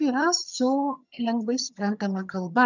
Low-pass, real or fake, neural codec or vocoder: 7.2 kHz; fake; vocoder, 22.05 kHz, 80 mel bands, HiFi-GAN